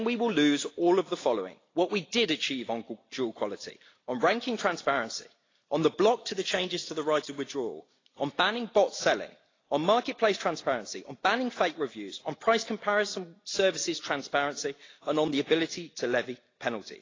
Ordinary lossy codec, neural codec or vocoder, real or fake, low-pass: AAC, 32 kbps; none; real; 7.2 kHz